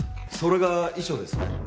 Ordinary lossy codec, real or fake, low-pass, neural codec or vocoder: none; real; none; none